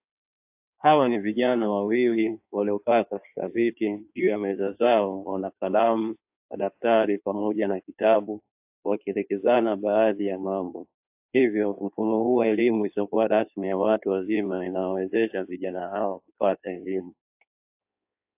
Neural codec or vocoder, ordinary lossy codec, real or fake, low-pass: codec, 16 kHz in and 24 kHz out, 1.1 kbps, FireRedTTS-2 codec; AAC, 32 kbps; fake; 3.6 kHz